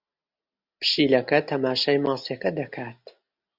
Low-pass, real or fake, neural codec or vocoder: 5.4 kHz; real; none